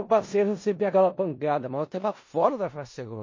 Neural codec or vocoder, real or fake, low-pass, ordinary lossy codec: codec, 16 kHz in and 24 kHz out, 0.4 kbps, LongCat-Audio-Codec, four codebook decoder; fake; 7.2 kHz; MP3, 32 kbps